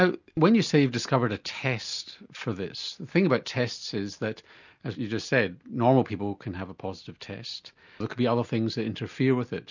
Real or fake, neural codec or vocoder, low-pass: real; none; 7.2 kHz